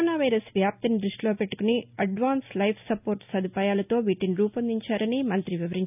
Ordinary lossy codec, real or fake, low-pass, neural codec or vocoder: none; real; 3.6 kHz; none